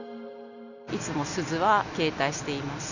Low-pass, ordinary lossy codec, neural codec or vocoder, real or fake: 7.2 kHz; none; none; real